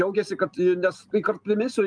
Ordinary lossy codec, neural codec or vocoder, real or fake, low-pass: Opus, 32 kbps; none; real; 9.9 kHz